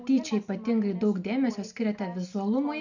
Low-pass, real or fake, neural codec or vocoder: 7.2 kHz; real; none